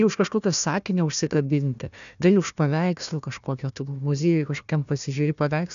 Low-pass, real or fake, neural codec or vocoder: 7.2 kHz; fake; codec, 16 kHz, 1 kbps, FunCodec, trained on Chinese and English, 50 frames a second